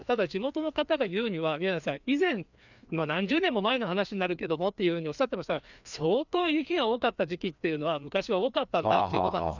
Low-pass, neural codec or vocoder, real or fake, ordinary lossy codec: 7.2 kHz; codec, 16 kHz, 2 kbps, FreqCodec, larger model; fake; none